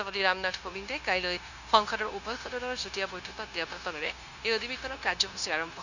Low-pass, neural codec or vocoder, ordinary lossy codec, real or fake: 7.2 kHz; codec, 16 kHz, 0.9 kbps, LongCat-Audio-Codec; none; fake